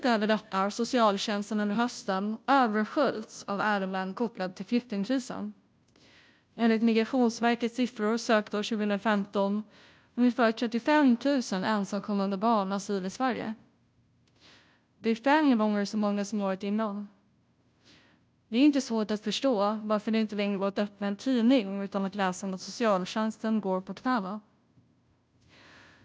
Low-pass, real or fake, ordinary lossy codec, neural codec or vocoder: none; fake; none; codec, 16 kHz, 0.5 kbps, FunCodec, trained on Chinese and English, 25 frames a second